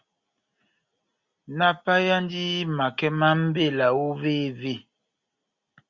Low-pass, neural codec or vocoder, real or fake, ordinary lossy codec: 7.2 kHz; none; real; Opus, 64 kbps